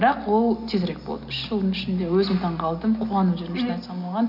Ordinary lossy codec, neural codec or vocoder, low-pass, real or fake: none; none; 5.4 kHz; real